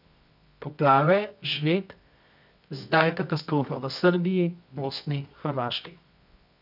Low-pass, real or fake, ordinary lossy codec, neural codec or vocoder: 5.4 kHz; fake; none; codec, 24 kHz, 0.9 kbps, WavTokenizer, medium music audio release